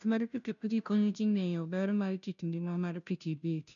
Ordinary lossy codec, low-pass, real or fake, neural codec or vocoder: none; 7.2 kHz; fake; codec, 16 kHz, 0.5 kbps, FunCodec, trained on Chinese and English, 25 frames a second